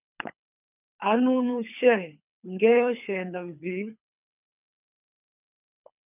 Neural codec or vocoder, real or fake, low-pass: codec, 24 kHz, 3 kbps, HILCodec; fake; 3.6 kHz